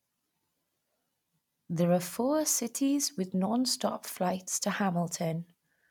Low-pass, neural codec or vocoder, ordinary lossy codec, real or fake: 19.8 kHz; none; none; real